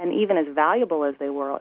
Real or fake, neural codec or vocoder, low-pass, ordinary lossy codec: real; none; 5.4 kHz; Opus, 24 kbps